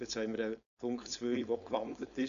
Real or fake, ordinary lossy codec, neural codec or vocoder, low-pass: fake; AAC, 96 kbps; codec, 16 kHz, 4.8 kbps, FACodec; 7.2 kHz